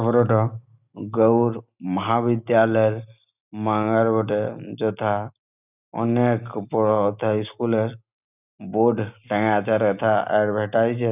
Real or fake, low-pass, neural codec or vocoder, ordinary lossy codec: real; 3.6 kHz; none; none